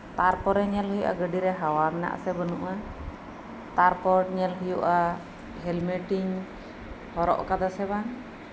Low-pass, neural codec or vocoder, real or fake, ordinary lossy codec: none; none; real; none